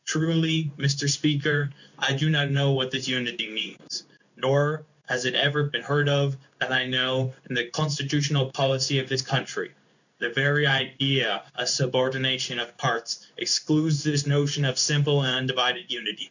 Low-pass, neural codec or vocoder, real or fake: 7.2 kHz; codec, 16 kHz in and 24 kHz out, 1 kbps, XY-Tokenizer; fake